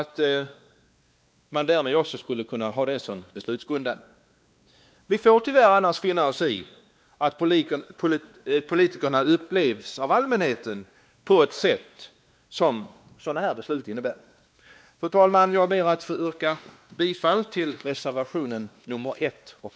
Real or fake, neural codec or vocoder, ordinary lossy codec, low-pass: fake; codec, 16 kHz, 2 kbps, X-Codec, WavLM features, trained on Multilingual LibriSpeech; none; none